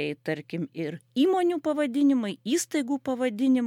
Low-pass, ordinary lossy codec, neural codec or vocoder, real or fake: 19.8 kHz; MP3, 96 kbps; none; real